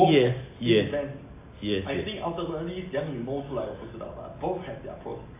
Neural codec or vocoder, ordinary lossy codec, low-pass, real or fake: none; AAC, 24 kbps; 3.6 kHz; real